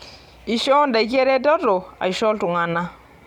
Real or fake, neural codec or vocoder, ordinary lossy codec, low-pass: real; none; none; 19.8 kHz